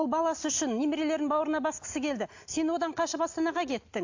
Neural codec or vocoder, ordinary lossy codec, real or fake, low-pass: none; AAC, 48 kbps; real; 7.2 kHz